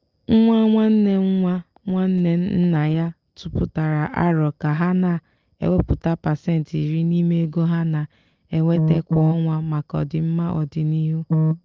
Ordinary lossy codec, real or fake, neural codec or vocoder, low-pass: Opus, 32 kbps; real; none; 7.2 kHz